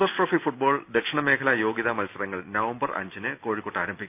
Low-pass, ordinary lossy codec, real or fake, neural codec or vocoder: 3.6 kHz; none; real; none